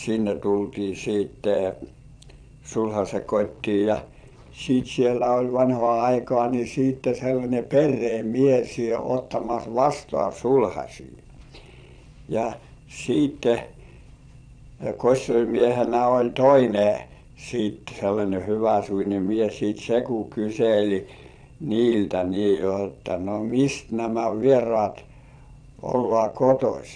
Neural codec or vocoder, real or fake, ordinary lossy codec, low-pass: vocoder, 22.05 kHz, 80 mel bands, Vocos; fake; none; 9.9 kHz